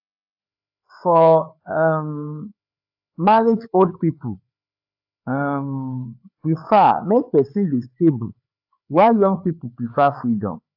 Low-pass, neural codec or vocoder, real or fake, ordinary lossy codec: 5.4 kHz; codec, 16 kHz, 4 kbps, FreqCodec, larger model; fake; AAC, 48 kbps